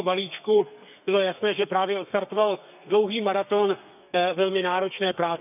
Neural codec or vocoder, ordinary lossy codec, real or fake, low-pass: codec, 32 kHz, 1.9 kbps, SNAC; none; fake; 3.6 kHz